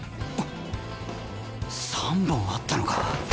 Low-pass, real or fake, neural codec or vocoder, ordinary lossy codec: none; real; none; none